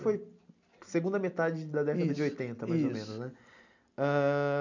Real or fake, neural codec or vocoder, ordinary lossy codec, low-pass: real; none; none; 7.2 kHz